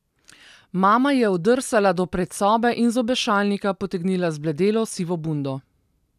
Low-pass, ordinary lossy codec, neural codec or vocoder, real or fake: 14.4 kHz; none; none; real